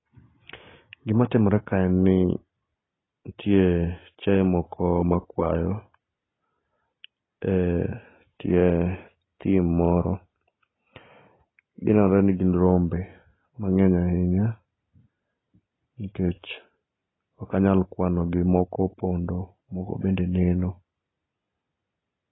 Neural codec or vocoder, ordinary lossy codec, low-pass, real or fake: none; AAC, 16 kbps; 7.2 kHz; real